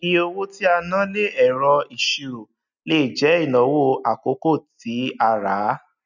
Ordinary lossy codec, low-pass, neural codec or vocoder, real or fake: none; 7.2 kHz; none; real